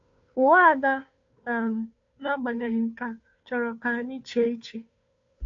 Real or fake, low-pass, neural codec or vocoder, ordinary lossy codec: fake; 7.2 kHz; codec, 16 kHz, 2 kbps, FunCodec, trained on Chinese and English, 25 frames a second; AAC, 48 kbps